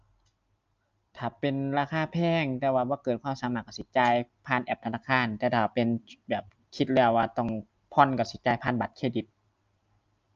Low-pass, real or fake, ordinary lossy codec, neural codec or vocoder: 7.2 kHz; real; Opus, 24 kbps; none